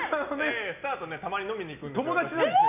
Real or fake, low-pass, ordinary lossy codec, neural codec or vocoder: real; 3.6 kHz; none; none